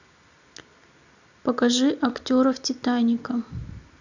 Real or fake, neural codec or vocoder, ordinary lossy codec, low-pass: real; none; none; 7.2 kHz